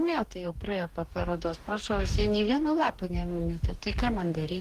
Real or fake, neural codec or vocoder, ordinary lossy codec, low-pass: fake; codec, 44.1 kHz, 2.6 kbps, DAC; Opus, 24 kbps; 14.4 kHz